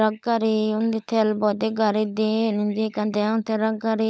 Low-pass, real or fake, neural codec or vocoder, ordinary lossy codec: none; fake; codec, 16 kHz, 16 kbps, FunCodec, trained on LibriTTS, 50 frames a second; none